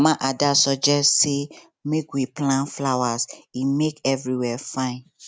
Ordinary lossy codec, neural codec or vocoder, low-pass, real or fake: none; none; none; real